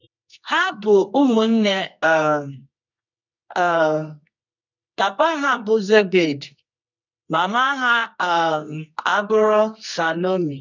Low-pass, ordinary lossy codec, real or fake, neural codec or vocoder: 7.2 kHz; none; fake; codec, 24 kHz, 0.9 kbps, WavTokenizer, medium music audio release